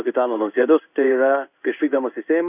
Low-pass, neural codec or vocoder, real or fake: 3.6 kHz; codec, 16 kHz in and 24 kHz out, 1 kbps, XY-Tokenizer; fake